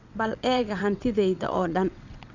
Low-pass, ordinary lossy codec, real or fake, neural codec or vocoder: 7.2 kHz; none; fake; vocoder, 22.05 kHz, 80 mel bands, WaveNeXt